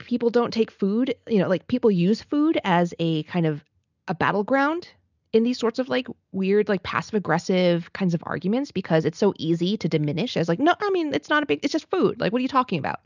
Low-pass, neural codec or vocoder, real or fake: 7.2 kHz; none; real